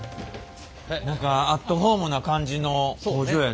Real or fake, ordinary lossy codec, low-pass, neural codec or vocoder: real; none; none; none